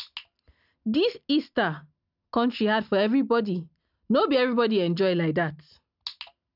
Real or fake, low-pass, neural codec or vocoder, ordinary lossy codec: real; 5.4 kHz; none; none